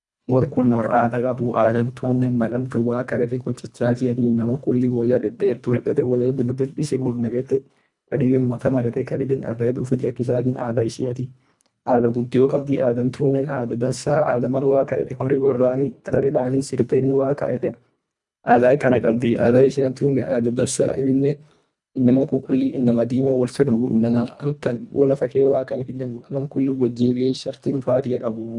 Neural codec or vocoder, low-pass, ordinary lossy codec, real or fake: codec, 24 kHz, 1.5 kbps, HILCodec; 10.8 kHz; none; fake